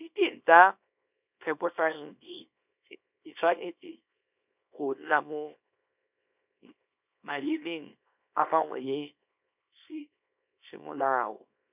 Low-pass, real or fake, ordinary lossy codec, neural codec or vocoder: 3.6 kHz; fake; none; codec, 24 kHz, 0.9 kbps, WavTokenizer, small release